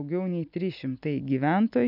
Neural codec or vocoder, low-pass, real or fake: none; 5.4 kHz; real